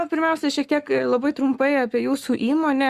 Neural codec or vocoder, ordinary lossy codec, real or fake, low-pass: codec, 44.1 kHz, 7.8 kbps, Pupu-Codec; Opus, 64 kbps; fake; 14.4 kHz